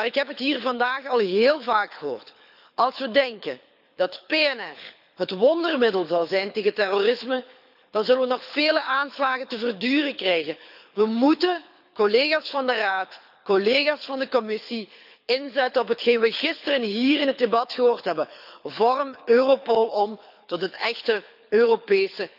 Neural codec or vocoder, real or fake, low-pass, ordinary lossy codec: codec, 24 kHz, 6 kbps, HILCodec; fake; 5.4 kHz; none